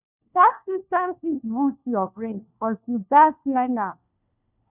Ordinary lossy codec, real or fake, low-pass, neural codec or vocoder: none; fake; 3.6 kHz; codec, 16 kHz, 1 kbps, FunCodec, trained on LibriTTS, 50 frames a second